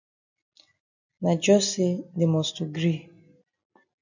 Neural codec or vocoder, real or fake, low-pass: none; real; 7.2 kHz